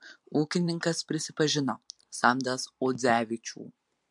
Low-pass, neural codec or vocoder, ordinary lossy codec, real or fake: 10.8 kHz; vocoder, 44.1 kHz, 128 mel bands every 512 samples, BigVGAN v2; MP3, 64 kbps; fake